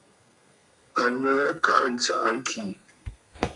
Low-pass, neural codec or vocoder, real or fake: 10.8 kHz; codec, 32 kHz, 1.9 kbps, SNAC; fake